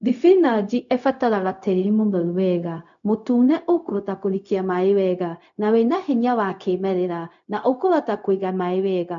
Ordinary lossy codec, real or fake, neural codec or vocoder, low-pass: none; fake; codec, 16 kHz, 0.4 kbps, LongCat-Audio-Codec; 7.2 kHz